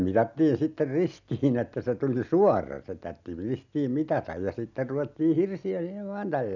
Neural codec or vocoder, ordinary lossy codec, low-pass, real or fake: none; none; 7.2 kHz; real